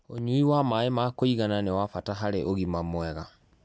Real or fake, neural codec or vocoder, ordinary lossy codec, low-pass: real; none; none; none